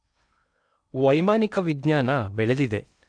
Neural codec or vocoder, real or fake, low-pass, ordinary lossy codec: codec, 16 kHz in and 24 kHz out, 0.8 kbps, FocalCodec, streaming, 65536 codes; fake; 9.9 kHz; none